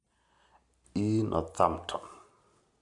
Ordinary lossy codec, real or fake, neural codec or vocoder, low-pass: none; real; none; 10.8 kHz